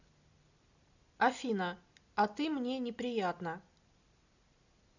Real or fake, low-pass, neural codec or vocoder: real; 7.2 kHz; none